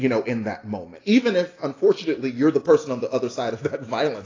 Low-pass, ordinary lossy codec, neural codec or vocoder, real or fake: 7.2 kHz; AAC, 32 kbps; none; real